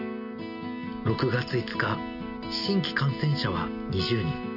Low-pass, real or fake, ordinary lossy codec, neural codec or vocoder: 5.4 kHz; real; none; none